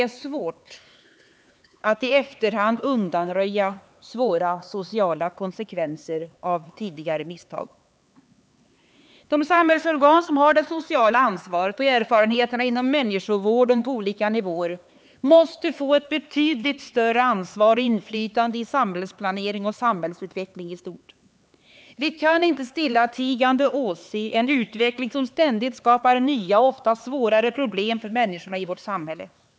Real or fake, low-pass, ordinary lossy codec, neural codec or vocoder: fake; none; none; codec, 16 kHz, 4 kbps, X-Codec, HuBERT features, trained on LibriSpeech